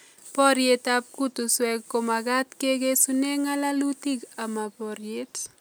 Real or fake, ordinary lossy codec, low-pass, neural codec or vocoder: real; none; none; none